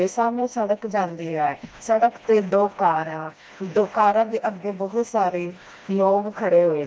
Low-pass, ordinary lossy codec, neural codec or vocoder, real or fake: none; none; codec, 16 kHz, 1 kbps, FreqCodec, smaller model; fake